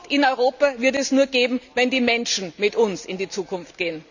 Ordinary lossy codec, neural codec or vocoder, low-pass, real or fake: none; none; 7.2 kHz; real